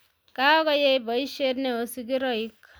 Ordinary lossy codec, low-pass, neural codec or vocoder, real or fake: none; none; none; real